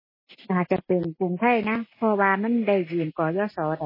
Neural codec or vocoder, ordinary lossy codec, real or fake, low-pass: none; MP3, 24 kbps; real; 5.4 kHz